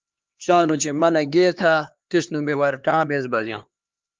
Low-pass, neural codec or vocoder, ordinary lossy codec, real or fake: 7.2 kHz; codec, 16 kHz, 2 kbps, X-Codec, HuBERT features, trained on LibriSpeech; Opus, 32 kbps; fake